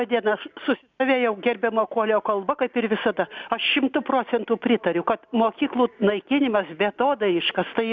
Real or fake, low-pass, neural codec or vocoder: real; 7.2 kHz; none